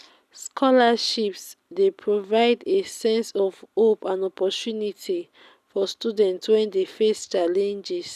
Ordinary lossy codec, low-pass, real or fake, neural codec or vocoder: none; 14.4 kHz; real; none